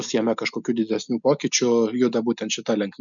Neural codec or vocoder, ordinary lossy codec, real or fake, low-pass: none; MP3, 96 kbps; real; 7.2 kHz